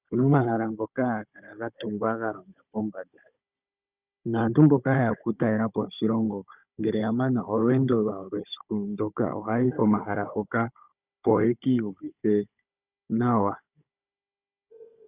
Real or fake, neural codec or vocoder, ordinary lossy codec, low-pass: fake; codec, 16 kHz, 16 kbps, FunCodec, trained on Chinese and English, 50 frames a second; Opus, 64 kbps; 3.6 kHz